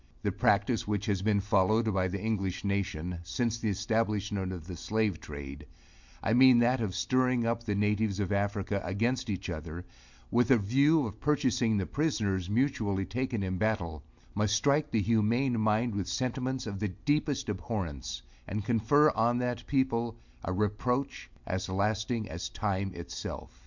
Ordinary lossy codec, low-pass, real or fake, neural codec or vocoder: MP3, 64 kbps; 7.2 kHz; real; none